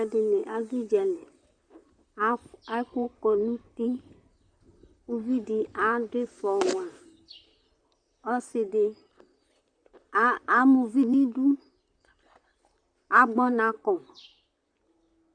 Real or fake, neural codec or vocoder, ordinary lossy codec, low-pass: fake; vocoder, 44.1 kHz, 128 mel bands, Pupu-Vocoder; Opus, 64 kbps; 9.9 kHz